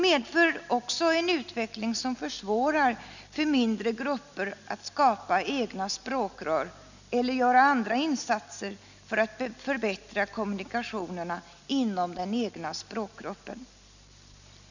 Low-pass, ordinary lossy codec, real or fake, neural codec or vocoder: 7.2 kHz; none; real; none